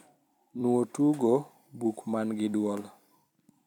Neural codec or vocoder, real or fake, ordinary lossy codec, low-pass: vocoder, 44.1 kHz, 128 mel bands every 256 samples, BigVGAN v2; fake; none; 19.8 kHz